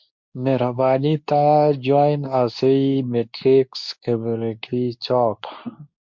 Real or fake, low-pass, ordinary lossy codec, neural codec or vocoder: fake; 7.2 kHz; MP3, 48 kbps; codec, 24 kHz, 0.9 kbps, WavTokenizer, medium speech release version 2